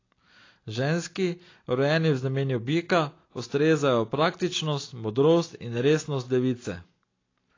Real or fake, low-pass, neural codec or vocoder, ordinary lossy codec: real; 7.2 kHz; none; AAC, 32 kbps